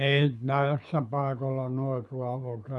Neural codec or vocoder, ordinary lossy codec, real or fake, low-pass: codec, 24 kHz, 6 kbps, HILCodec; none; fake; none